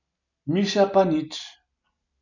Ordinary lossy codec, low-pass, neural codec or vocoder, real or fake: none; 7.2 kHz; none; real